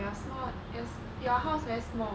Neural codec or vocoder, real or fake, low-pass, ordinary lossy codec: none; real; none; none